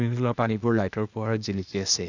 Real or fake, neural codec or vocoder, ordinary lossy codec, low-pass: fake; codec, 16 kHz, 0.8 kbps, ZipCodec; none; 7.2 kHz